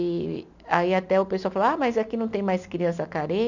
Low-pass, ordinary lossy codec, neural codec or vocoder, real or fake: 7.2 kHz; none; none; real